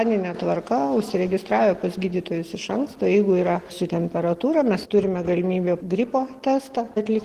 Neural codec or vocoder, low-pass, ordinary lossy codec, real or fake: none; 14.4 kHz; Opus, 16 kbps; real